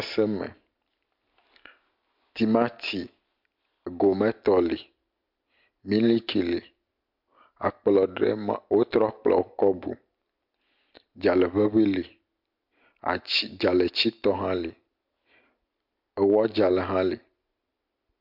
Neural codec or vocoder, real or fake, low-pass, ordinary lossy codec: none; real; 5.4 kHz; MP3, 48 kbps